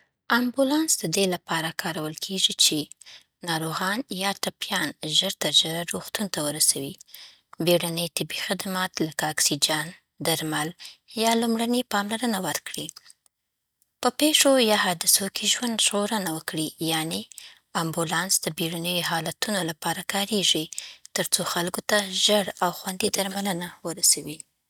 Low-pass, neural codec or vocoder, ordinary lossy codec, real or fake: none; none; none; real